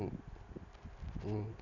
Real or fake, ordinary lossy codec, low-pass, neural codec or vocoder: real; none; 7.2 kHz; none